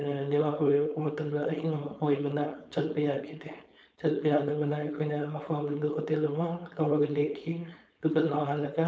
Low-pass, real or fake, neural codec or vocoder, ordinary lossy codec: none; fake; codec, 16 kHz, 4.8 kbps, FACodec; none